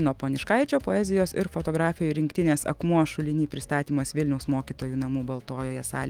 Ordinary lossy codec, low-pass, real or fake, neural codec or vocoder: Opus, 24 kbps; 19.8 kHz; fake; autoencoder, 48 kHz, 128 numbers a frame, DAC-VAE, trained on Japanese speech